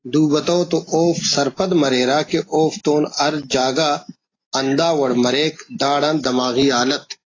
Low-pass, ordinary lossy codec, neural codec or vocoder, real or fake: 7.2 kHz; AAC, 32 kbps; none; real